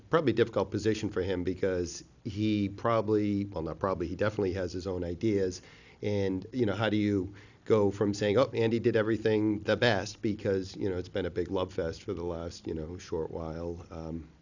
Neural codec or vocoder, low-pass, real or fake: none; 7.2 kHz; real